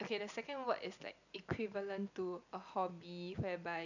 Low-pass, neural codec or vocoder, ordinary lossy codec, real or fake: 7.2 kHz; none; none; real